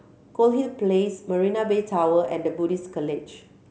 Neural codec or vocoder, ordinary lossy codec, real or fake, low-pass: none; none; real; none